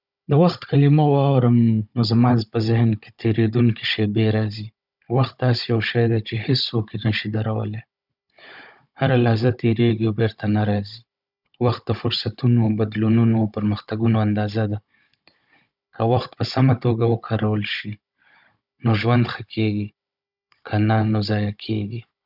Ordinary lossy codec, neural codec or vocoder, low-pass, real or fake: none; codec, 16 kHz, 16 kbps, FunCodec, trained on Chinese and English, 50 frames a second; 5.4 kHz; fake